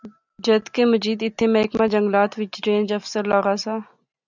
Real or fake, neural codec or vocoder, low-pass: real; none; 7.2 kHz